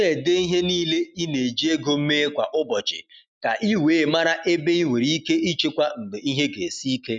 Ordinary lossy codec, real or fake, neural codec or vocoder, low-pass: none; real; none; none